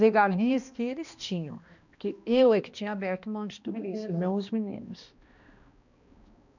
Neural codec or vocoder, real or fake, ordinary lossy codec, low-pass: codec, 16 kHz, 1 kbps, X-Codec, HuBERT features, trained on balanced general audio; fake; none; 7.2 kHz